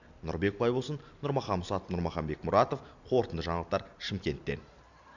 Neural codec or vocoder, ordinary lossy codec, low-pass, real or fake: none; none; 7.2 kHz; real